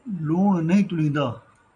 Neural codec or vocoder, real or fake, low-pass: none; real; 9.9 kHz